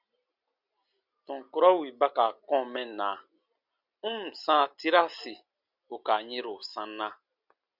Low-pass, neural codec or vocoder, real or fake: 5.4 kHz; none; real